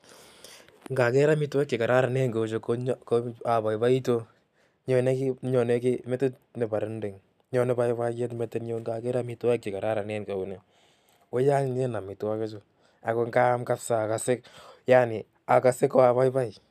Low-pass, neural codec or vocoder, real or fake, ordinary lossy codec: 14.4 kHz; none; real; none